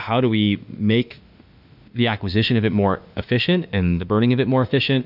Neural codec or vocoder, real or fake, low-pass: autoencoder, 48 kHz, 32 numbers a frame, DAC-VAE, trained on Japanese speech; fake; 5.4 kHz